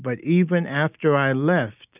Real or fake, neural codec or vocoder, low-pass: real; none; 3.6 kHz